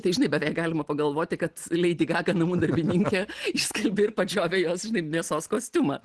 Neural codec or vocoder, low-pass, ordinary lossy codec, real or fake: none; 10.8 kHz; Opus, 16 kbps; real